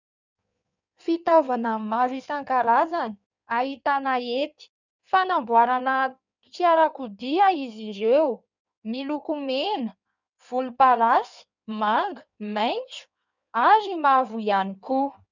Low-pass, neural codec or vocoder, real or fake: 7.2 kHz; codec, 16 kHz in and 24 kHz out, 1.1 kbps, FireRedTTS-2 codec; fake